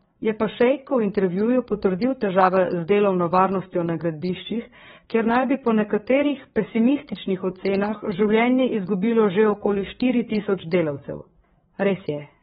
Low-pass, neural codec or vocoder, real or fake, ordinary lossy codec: 7.2 kHz; codec, 16 kHz, 4 kbps, FreqCodec, larger model; fake; AAC, 16 kbps